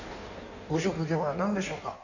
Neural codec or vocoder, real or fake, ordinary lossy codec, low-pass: codec, 16 kHz in and 24 kHz out, 1.1 kbps, FireRedTTS-2 codec; fake; none; 7.2 kHz